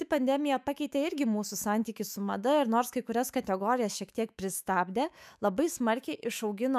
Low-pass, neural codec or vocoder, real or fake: 14.4 kHz; autoencoder, 48 kHz, 128 numbers a frame, DAC-VAE, trained on Japanese speech; fake